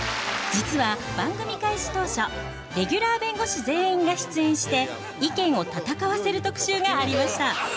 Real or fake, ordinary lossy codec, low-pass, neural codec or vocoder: real; none; none; none